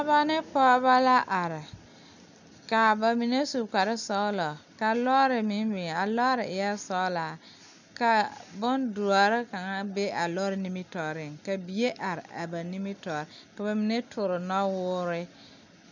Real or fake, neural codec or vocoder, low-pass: real; none; 7.2 kHz